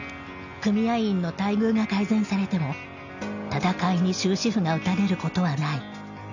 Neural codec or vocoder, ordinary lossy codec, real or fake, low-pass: none; none; real; 7.2 kHz